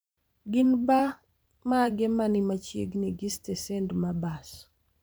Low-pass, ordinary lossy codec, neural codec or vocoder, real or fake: none; none; vocoder, 44.1 kHz, 128 mel bands every 512 samples, BigVGAN v2; fake